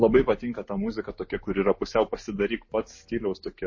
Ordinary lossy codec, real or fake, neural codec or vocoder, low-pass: MP3, 32 kbps; real; none; 7.2 kHz